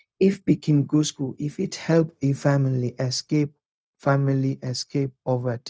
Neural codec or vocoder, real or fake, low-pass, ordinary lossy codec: codec, 16 kHz, 0.4 kbps, LongCat-Audio-Codec; fake; none; none